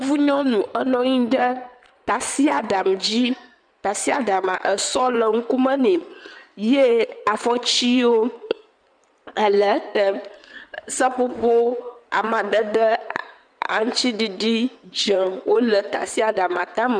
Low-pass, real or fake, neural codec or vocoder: 9.9 kHz; fake; codec, 16 kHz in and 24 kHz out, 2.2 kbps, FireRedTTS-2 codec